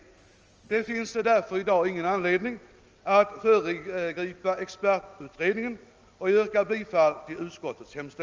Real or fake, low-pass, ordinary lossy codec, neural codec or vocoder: real; 7.2 kHz; Opus, 24 kbps; none